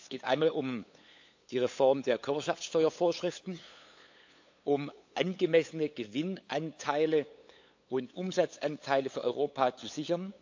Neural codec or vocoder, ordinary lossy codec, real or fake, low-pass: codec, 16 kHz, 8 kbps, FunCodec, trained on LibriTTS, 25 frames a second; AAC, 48 kbps; fake; 7.2 kHz